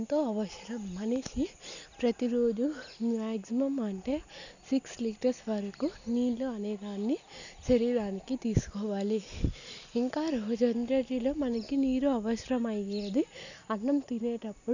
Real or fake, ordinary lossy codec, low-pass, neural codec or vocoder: real; none; 7.2 kHz; none